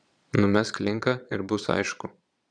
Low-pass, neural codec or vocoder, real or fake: 9.9 kHz; vocoder, 22.05 kHz, 80 mel bands, Vocos; fake